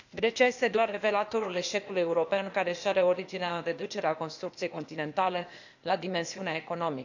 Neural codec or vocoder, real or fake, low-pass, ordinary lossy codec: codec, 16 kHz, 0.8 kbps, ZipCodec; fake; 7.2 kHz; none